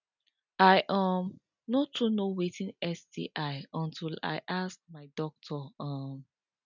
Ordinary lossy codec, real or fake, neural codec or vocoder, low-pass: none; real; none; 7.2 kHz